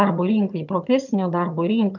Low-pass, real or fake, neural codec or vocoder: 7.2 kHz; fake; vocoder, 22.05 kHz, 80 mel bands, HiFi-GAN